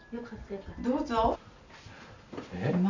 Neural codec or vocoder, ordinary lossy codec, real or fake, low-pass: none; none; real; 7.2 kHz